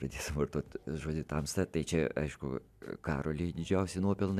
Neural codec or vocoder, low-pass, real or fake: none; 14.4 kHz; real